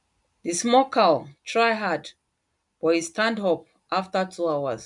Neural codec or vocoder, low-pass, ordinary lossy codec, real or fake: none; 10.8 kHz; none; real